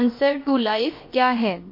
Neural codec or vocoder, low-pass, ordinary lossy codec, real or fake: codec, 16 kHz, about 1 kbps, DyCAST, with the encoder's durations; 5.4 kHz; MP3, 32 kbps; fake